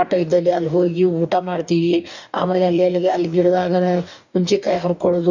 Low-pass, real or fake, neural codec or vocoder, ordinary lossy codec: 7.2 kHz; fake; codec, 44.1 kHz, 2.6 kbps, DAC; none